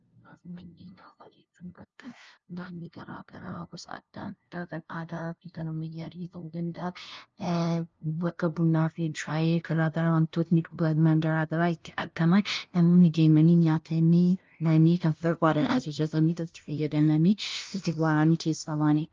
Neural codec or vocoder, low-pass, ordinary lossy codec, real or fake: codec, 16 kHz, 0.5 kbps, FunCodec, trained on LibriTTS, 25 frames a second; 7.2 kHz; Opus, 24 kbps; fake